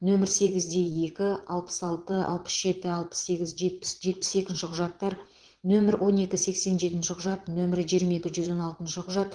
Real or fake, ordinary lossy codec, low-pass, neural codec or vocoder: fake; Opus, 16 kbps; 9.9 kHz; vocoder, 22.05 kHz, 80 mel bands, Vocos